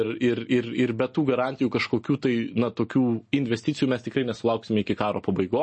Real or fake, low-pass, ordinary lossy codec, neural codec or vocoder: real; 10.8 kHz; MP3, 32 kbps; none